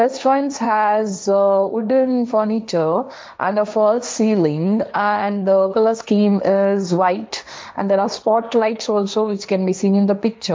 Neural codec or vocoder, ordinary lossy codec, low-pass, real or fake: codec, 16 kHz, 1.1 kbps, Voila-Tokenizer; none; none; fake